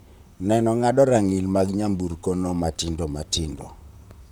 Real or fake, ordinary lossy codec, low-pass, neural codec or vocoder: fake; none; none; vocoder, 44.1 kHz, 128 mel bands, Pupu-Vocoder